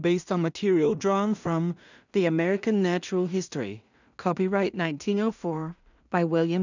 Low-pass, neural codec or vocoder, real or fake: 7.2 kHz; codec, 16 kHz in and 24 kHz out, 0.4 kbps, LongCat-Audio-Codec, two codebook decoder; fake